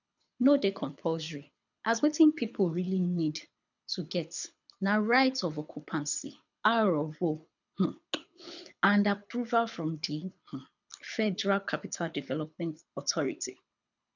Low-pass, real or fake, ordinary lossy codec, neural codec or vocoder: 7.2 kHz; fake; none; codec, 24 kHz, 6 kbps, HILCodec